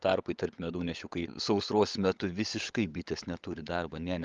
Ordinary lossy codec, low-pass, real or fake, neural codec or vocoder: Opus, 32 kbps; 7.2 kHz; fake; codec, 16 kHz, 16 kbps, FunCodec, trained on LibriTTS, 50 frames a second